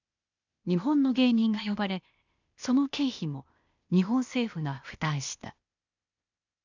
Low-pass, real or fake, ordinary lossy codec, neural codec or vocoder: 7.2 kHz; fake; none; codec, 16 kHz, 0.8 kbps, ZipCodec